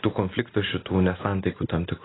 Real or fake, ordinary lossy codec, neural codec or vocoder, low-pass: real; AAC, 16 kbps; none; 7.2 kHz